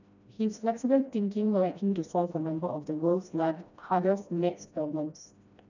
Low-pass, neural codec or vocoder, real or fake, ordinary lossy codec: 7.2 kHz; codec, 16 kHz, 1 kbps, FreqCodec, smaller model; fake; none